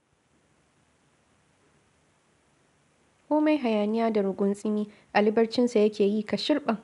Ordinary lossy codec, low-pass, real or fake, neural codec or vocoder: none; 10.8 kHz; real; none